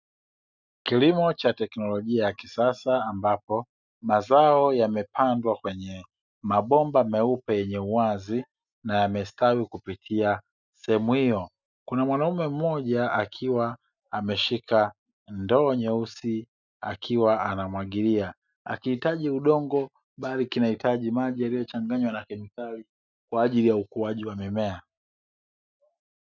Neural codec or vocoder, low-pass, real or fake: none; 7.2 kHz; real